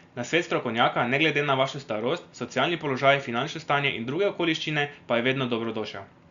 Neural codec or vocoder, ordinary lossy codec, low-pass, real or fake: none; Opus, 64 kbps; 7.2 kHz; real